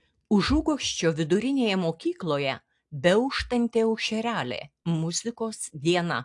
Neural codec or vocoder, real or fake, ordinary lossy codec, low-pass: none; real; AAC, 64 kbps; 10.8 kHz